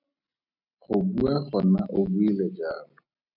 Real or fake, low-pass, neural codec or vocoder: real; 5.4 kHz; none